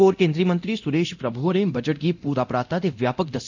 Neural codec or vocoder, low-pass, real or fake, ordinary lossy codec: codec, 24 kHz, 0.9 kbps, DualCodec; 7.2 kHz; fake; none